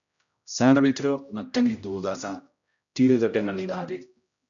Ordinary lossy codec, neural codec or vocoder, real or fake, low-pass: MP3, 96 kbps; codec, 16 kHz, 0.5 kbps, X-Codec, HuBERT features, trained on general audio; fake; 7.2 kHz